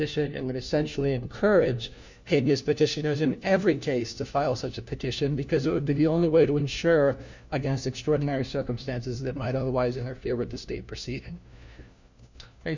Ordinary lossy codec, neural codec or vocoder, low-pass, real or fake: Opus, 64 kbps; codec, 16 kHz, 1 kbps, FunCodec, trained on LibriTTS, 50 frames a second; 7.2 kHz; fake